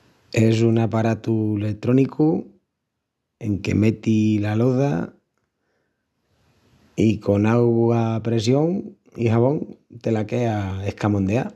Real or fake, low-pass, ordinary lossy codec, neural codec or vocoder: real; none; none; none